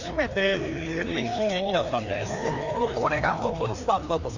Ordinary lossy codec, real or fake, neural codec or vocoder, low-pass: none; fake; codec, 16 kHz, 2 kbps, FreqCodec, larger model; 7.2 kHz